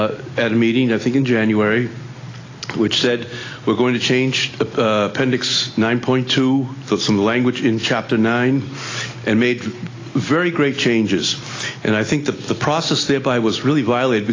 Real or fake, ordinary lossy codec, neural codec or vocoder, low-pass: real; AAC, 32 kbps; none; 7.2 kHz